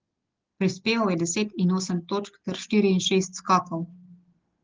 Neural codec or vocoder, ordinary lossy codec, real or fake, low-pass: none; Opus, 16 kbps; real; 7.2 kHz